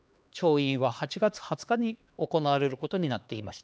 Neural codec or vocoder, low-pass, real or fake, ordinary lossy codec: codec, 16 kHz, 2 kbps, X-Codec, HuBERT features, trained on LibriSpeech; none; fake; none